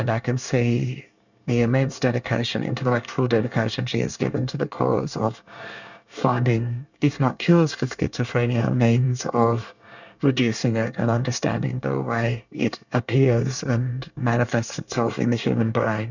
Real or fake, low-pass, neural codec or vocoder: fake; 7.2 kHz; codec, 24 kHz, 1 kbps, SNAC